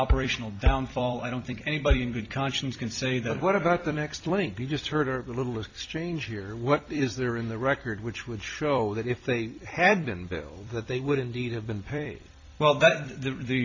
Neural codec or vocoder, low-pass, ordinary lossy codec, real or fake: vocoder, 44.1 kHz, 128 mel bands every 256 samples, BigVGAN v2; 7.2 kHz; MP3, 48 kbps; fake